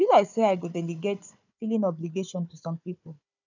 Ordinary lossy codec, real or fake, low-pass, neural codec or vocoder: none; fake; 7.2 kHz; codec, 16 kHz, 4 kbps, FunCodec, trained on Chinese and English, 50 frames a second